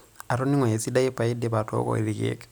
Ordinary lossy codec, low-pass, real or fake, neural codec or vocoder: none; none; real; none